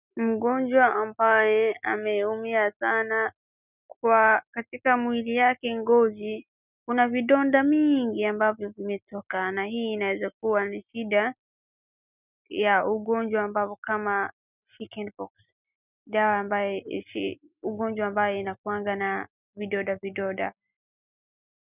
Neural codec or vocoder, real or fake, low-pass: none; real; 3.6 kHz